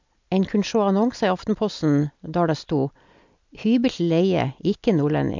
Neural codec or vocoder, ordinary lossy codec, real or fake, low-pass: none; MP3, 64 kbps; real; 7.2 kHz